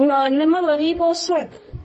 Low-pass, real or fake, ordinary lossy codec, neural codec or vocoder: 10.8 kHz; fake; MP3, 32 kbps; codec, 24 kHz, 0.9 kbps, WavTokenizer, medium music audio release